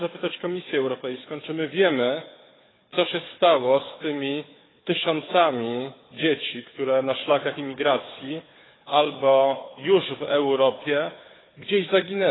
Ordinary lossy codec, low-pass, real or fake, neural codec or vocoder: AAC, 16 kbps; 7.2 kHz; fake; codec, 16 kHz, 4 kbps, FunCodec, trained on Chinese and English, 50 frames a second